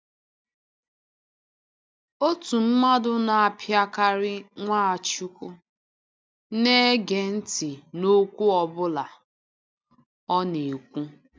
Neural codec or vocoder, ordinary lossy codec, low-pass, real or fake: none; none; none; real